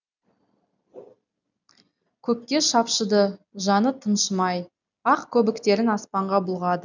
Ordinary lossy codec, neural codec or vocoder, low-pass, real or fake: none; none; 7.2 kHz; real